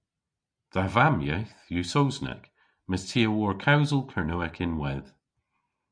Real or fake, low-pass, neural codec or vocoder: real; 9.9 kHz; none